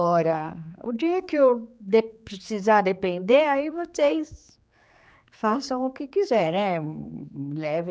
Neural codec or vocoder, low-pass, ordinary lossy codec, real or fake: codec, 16 kHz, 4 kbps, X-Codec, HuBERT features, trained on general audio; none; none; fake